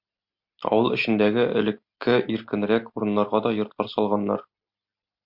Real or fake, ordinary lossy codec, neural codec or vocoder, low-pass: real; MP3, 48 kbps; none; 5.4 kHz